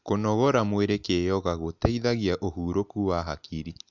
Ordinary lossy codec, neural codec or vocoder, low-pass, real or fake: none; none; 7.2 kHz; real